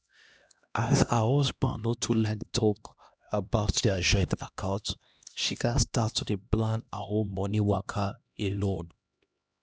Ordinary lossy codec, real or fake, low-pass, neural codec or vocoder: none; fake; none; codec, 16 kHz, 1 kbps, X-Codec, HuBERT features, trained on LibriSpeech